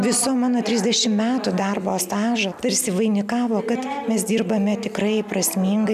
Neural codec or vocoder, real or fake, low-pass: none; real; 14.4 kHz